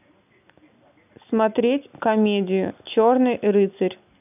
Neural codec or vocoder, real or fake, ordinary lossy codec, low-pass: none; real; none; 3.6 kHz